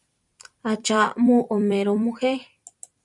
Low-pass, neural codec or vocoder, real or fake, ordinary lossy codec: 10.8 kHz; vocoder, 44.1 kHz, 128 mel bands every 256 samples, BigVGAN v2; fake; MP3, 96 kbps